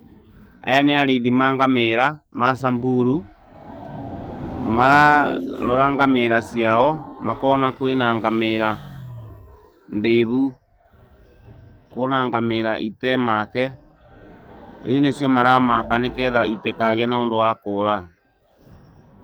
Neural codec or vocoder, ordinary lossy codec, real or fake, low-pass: codec, 44.1 kHz, 2.6 kbps, SNAC; none; fake; none